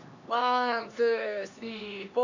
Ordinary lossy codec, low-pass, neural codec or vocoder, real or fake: none; 7.2 kHz; codec, 16 kHz, 2 kbps, X-Codec, HuBERT features, trained on LibriSpeech; fake